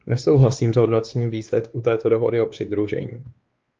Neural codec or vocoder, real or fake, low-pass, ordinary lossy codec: codec, 16 kHz, 2 kbps, X-Codec, WavLM features, trained on Multilingual LibriSpeech; fake; 7.2 kHz; Opus, 24 kbps